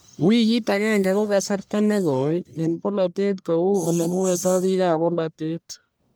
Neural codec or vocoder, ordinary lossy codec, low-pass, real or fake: codec, 44.1 kHz, 1.7 kbps, Pupu-Codec; none; none; fake